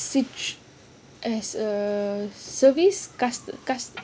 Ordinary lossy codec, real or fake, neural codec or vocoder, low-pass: none; real; none; none